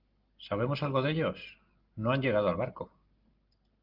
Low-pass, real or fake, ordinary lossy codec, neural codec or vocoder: 5.4 kHz; real; Opus, 32 kbps; none